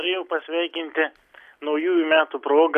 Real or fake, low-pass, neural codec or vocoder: real; 14.4 kHz; none